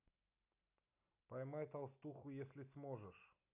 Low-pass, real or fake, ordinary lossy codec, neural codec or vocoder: 3.6 kHz; real; none; none